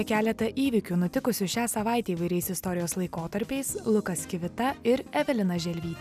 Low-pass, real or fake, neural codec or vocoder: 14.4 kHz; real; none